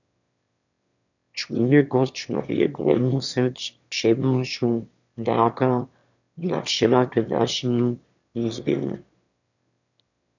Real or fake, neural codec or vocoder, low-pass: fake; autoencoder, 22.05 kHz, a latent of 192 numbers a frame, VITS, trained on one speaker; 7.2 kHz